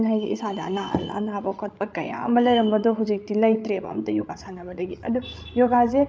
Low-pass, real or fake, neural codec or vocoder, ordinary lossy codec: none; fake; codec, 16 kHz, 16 kbps, FreqCodec, larger model; none